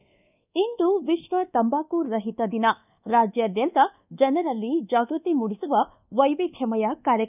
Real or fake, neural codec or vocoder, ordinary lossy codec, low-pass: fake; codec, 24 kHz, 1.2 kbps, DualCodec; none; 3.6 kHz